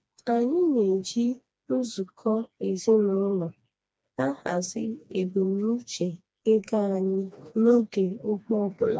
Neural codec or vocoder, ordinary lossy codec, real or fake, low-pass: codec, 16 kHz, 2 kbps, FreqCodec, smaller model; none; fake; none